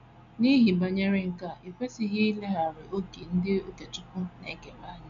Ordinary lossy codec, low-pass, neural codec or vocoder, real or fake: none; 7.2 kHz; none; real